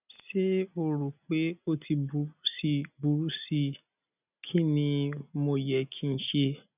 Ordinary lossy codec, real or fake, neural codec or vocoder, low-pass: none; real; none; 3.6 kHz